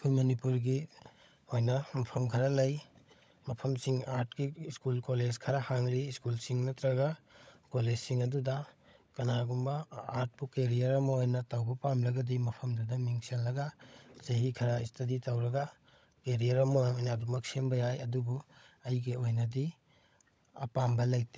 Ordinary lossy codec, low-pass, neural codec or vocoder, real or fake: none; none; codec, 16 kHz, 16 kbps, FunCodec, trained on LibriTTS, 50 frames a second; fake